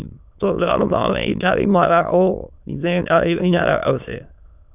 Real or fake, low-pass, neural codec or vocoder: fake; 3.6 kHz; autoencoder, 22.05 kHz, a latent of 192 numbers a frame, VITS, trained on many speakers